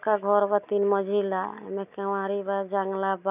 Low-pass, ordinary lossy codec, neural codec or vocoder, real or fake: 3.6 kHz; none; none; real